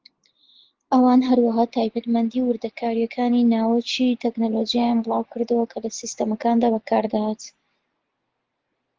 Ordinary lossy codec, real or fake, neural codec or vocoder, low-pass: Opus, 16 kbps; real; none; 7.2 kHz